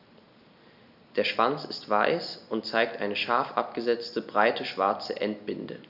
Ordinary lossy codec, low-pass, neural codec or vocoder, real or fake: none; 5.4 kHz; none; real